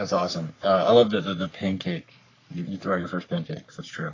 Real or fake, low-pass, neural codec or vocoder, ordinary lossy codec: fake; 7.2 kHz; codec, 44.1 kHz, 3.4 kbps, Pupu-Codec; AAC, 32 kbps